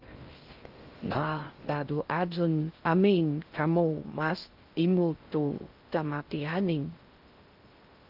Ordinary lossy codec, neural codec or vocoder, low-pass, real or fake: Opus, 24 kbps; codec, 16 kHz in and 24 kHz out, 0.6 kbps, FocalCodec, streaming, 2048 codes; 5.4 kHz; fake